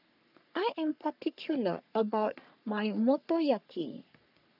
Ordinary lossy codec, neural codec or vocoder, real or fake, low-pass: none; codec, 44.1 kHz, 3.4 kbps, Pupu-Codec; fake; 5.4 kHz